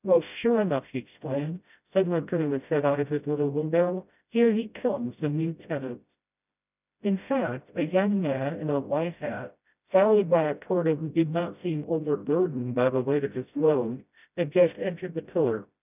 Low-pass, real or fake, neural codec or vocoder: 3.6 kHz; fake; codec, 16 kHz, 0.5 kbps, FreqCodec, smaller model